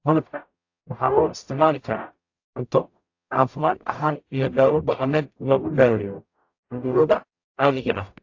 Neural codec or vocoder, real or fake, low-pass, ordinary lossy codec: codec, 44.1 kHz, 0.9 kbps, DAC; fake; 7.2 kHz; AAC, 48 kbps